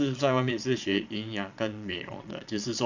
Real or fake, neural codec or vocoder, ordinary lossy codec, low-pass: fake; vocoder, 22.05 kHz, 80 mel bands, Vocos; Opus, 64 kbps; 7.2 kHz